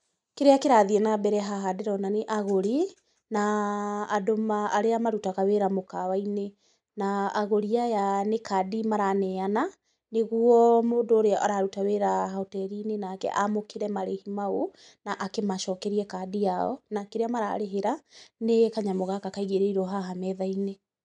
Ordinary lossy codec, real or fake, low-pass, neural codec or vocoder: none; real; 10.8 kHz; none